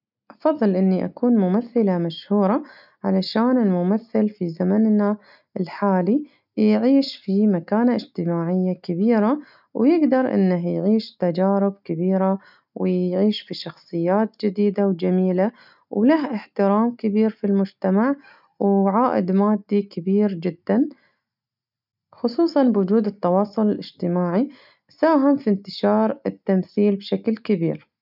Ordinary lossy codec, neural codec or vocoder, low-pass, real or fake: none; none; 5.4 kHz; real